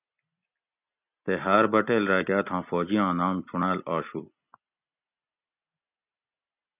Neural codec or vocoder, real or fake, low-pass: none; real; 3.6 kHz